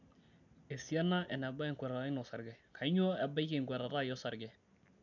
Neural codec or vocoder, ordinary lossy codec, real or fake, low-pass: none; none; real; 7.2 kHz